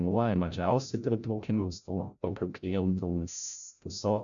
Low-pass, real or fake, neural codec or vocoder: 7.2 kHz; fake; codec, 16 kHz, 0.5 kbps, FreqCodec, larger model